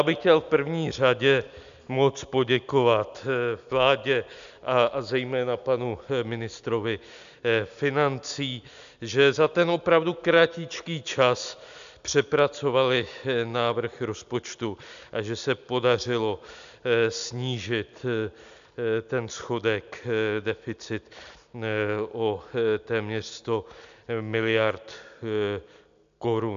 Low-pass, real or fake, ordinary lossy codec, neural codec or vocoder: 7.2 kHz; real; AAC, 96 kbps; none